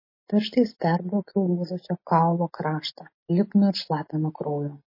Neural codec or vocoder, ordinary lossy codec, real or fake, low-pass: none; MP3, 24 kbps; real; 5.4 kHz